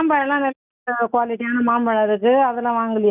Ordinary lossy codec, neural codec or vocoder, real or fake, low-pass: none; none; real; 3.6 kHz